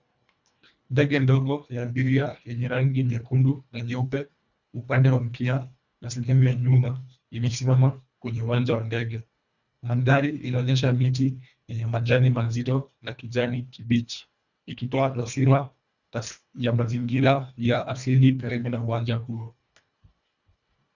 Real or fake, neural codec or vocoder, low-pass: fake; codec, 24 kHz, 1.5 kbps, HILCodec; 7.2 kHz